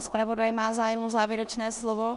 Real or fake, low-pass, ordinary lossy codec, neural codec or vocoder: fake; 10.8 kHz; AAC, 96 kbps; codec, 16 kHz in and 24 kHz out, 0.9 kbps, LongCat-Audio-Codec, four codebook decoder